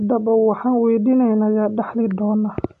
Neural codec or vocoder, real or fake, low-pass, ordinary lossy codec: none; real; 10.8 kHz; none